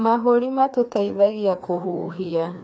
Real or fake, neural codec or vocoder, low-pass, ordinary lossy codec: fake; codec, 16 kHz, 2 kbps, FreqCodec, larger model; none; none